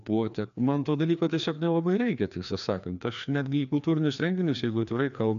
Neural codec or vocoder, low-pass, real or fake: codec, 16 kHz, 2 kbps, FreqCodec, larger model; 7.2 kHz; fake